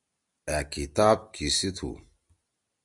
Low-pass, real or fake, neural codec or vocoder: 10.8 kHz; real; none